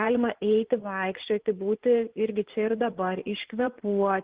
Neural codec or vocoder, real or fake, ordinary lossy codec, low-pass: vocoder, 24 kHz, 100 mel bands, Vocos; fake; Opus, 16 kbps; 3.6 kHz